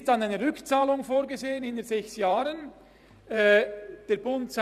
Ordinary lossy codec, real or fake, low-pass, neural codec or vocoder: none; fake; 14.4 kHz; vocoder, 44.1 kHz, 128 mel bands every 512 samples, BigVGAN v2